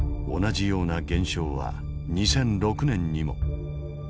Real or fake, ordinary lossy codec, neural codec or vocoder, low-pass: real; none; none; none